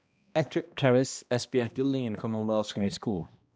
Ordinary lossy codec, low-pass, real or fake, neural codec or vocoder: none; none; fake; codec, 16 kHz, 1 kbps, X-Codec, HuBERT features, trained on balanced general audio